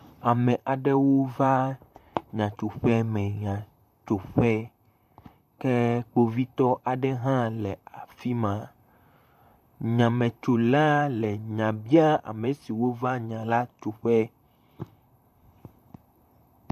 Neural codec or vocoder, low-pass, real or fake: vocoder, 44.1 kHz, 128 mel bands, Pupu-Vocoder; 14.4 kHz; fake